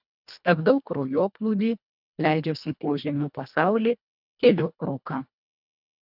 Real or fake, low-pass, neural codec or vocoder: fake; 5.4 kHz; codec, 24 kHz, 1.5 kbps, HILCodec